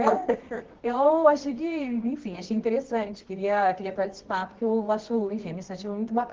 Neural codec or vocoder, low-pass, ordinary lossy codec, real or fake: codec, 24 kHz, 0.9 kbps, WavTokenizer, medium music audio release; 7.2 kHz; Opus, 16 kbps; fake